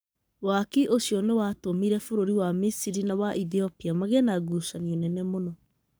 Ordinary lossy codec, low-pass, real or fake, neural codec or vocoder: none; none; fake; codec, 44.1 kHz, 7.8 kbps, Pupu-Codec